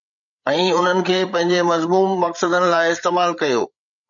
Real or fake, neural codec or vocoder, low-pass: fake; codec, 16 kHz, 8 kbps, FreqCodec, larger model; 7.2 kHz